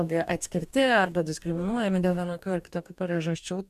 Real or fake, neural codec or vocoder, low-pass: fake; codec, 44.1 kHz, 2.6 kbps, DAC; 14.4 kHz